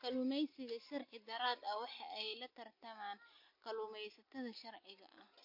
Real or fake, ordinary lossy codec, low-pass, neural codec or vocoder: real; MP3, 32 kbps; 5.4 kHz; none